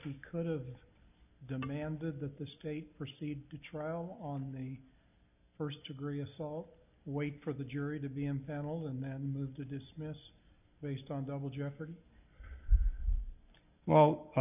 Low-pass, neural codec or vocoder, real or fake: 3.6 kHz; none; real